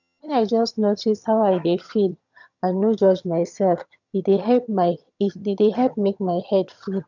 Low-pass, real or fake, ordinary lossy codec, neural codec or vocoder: 7.2 kHz; fake; none; vocoder, 22.05 kHz, 80 mel bands, HiFi-GAN